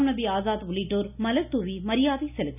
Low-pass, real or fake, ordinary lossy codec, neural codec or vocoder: 3.6 kHz; real; none; none